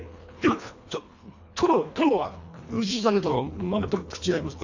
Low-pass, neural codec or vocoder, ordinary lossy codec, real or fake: 7.2 kHz; codec, 24 kHz, 1.5 kbps, HILCodec; AAC, 48 kbps; fake